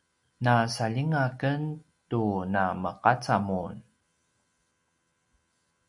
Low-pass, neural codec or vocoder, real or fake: 10.8 kHz; none; real